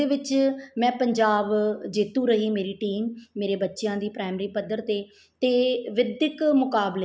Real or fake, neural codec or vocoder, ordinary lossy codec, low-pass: real; none; none; none